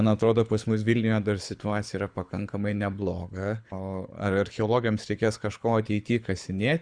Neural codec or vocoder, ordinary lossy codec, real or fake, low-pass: codec, 24 kHz, 6 kbps, HILCodec; Opus, 64 kbps; fake; 9.9 kHz